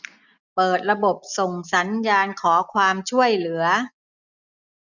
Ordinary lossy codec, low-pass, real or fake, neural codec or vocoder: none; 7.2 kHz; real; none